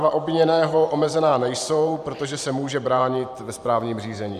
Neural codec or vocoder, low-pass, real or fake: vocoder, 44.1 kHz, 128 mel bands every 512 samples, BigVGAN v2; 14.4 kHz; fake